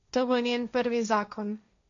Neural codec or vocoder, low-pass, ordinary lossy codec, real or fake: codec, 16 kHz, 1.1 kbps, Voila-Tokenizer; 7.2 kHz; none; fake